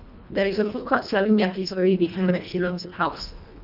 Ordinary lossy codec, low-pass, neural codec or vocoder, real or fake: none; 5.4 kHz; codec, 24 kHz, 1.5 kbps, HILCodec; fake